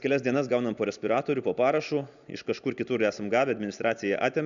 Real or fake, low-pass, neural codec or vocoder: real; 7.2 kHz; none